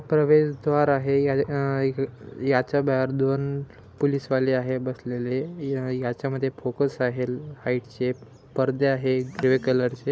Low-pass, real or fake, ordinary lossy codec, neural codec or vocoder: none; real; none; none